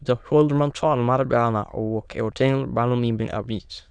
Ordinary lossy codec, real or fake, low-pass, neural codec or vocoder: none; fake; 9.9 kHz; autoencoder, 22.05 kHz, a latent of 192 numbers a frame, VITS, trained on many speakers